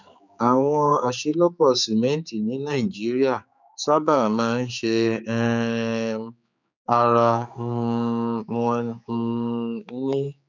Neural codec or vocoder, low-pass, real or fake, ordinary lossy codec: codec, 16 kHz, 4 kbps, X-Codec, HuBERT features, trained on general audio; 7.2 kHz; fake; none